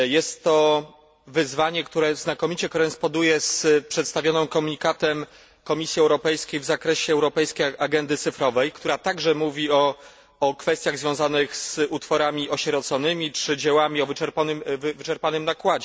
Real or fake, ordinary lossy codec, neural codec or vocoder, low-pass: real; none; none; none